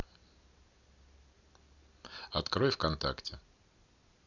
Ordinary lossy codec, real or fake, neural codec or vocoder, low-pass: none; real; none; 7.2 kHz